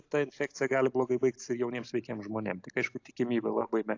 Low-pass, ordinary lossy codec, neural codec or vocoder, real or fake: 7.2 kHz; AAC, 48 kbps; none; real